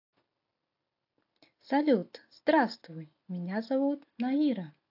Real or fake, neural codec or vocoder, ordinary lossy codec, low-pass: real; none; AAC, 32 kbps; 5.4 kHz